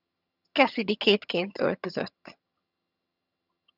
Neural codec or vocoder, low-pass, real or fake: vocoder, 22.05 kHz, 80 mel bands, HiFi-GAN; 5.4 kHz; fake